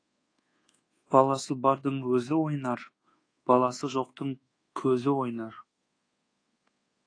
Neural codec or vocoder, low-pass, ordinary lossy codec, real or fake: codec, 24 kHz, 1.2 kbps, DualCodec; 9.9 kHz; AAC, 32 kbps; fake